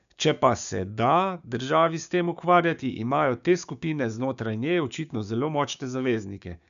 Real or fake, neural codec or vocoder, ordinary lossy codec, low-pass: fake; codec, 16 kHz, 6 kbps, DAC; none; 7.2 kHz